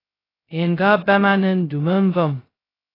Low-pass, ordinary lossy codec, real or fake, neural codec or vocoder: 5.4 kHz; AAC, 24 kbps; fake; codec, 16 kHz, 0.2 kbps, FocalCodec